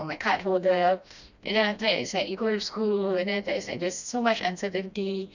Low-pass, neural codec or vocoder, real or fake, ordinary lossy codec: 7.2 kHz; codec, 16 kHz, 1 kbps, FreqCodec, smaller model; fake; none